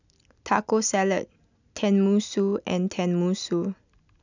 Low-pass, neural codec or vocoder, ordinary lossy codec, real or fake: 7.2 kHz; none; none; real